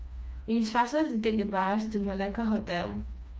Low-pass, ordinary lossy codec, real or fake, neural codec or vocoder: none; none; fake; codec, 16 kHz, 2 kbps, FreqCodec, smaller model